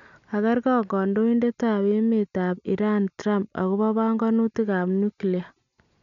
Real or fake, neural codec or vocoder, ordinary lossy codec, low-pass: real; none; none; 7.2 kHz